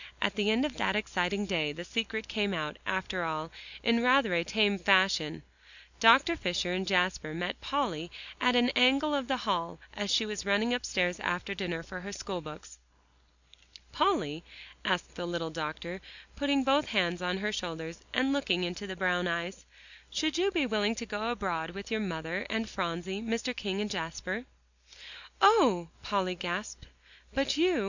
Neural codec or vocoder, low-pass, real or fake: none; 7.2 kHz; real